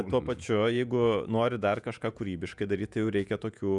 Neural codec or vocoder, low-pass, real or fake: vocoder, 48 kHz, 128 mel bands, Vocos; 10.8 kHz; fake